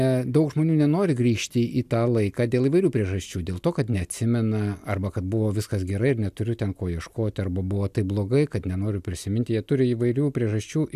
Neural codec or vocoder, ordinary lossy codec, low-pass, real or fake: none; AAC, 96 kbps; 14.4 kHz; real